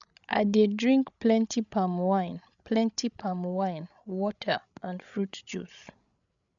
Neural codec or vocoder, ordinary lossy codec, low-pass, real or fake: codec, 16 kHz, 16 kbps, FreqCodec, larger model; none; 7.2 kHz; fake